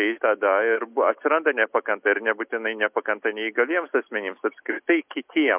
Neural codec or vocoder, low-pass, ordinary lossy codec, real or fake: none; 3.6 kHz; MP3, 32 kbps; real